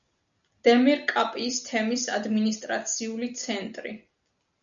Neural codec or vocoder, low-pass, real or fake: none; 7.2 kHz; real